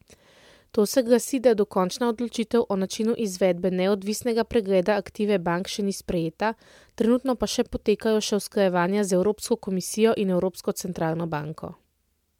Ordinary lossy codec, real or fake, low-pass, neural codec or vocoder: MP3, 96 kbps; real; 19.8 kHz; none